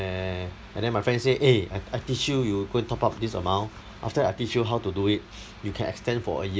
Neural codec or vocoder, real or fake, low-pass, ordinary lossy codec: none; real; none; none